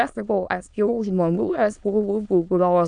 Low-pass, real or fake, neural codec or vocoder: 9.9 kHz; fake; autoencoder, 22.05 kHz, a latent of 192 numbers a frame, VITS, trained on many speakers